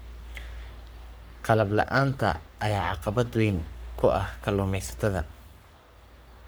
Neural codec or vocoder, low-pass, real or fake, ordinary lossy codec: codec, 44.1 kHz, 3.4 kbps, Pupu-Codec; none; fake; none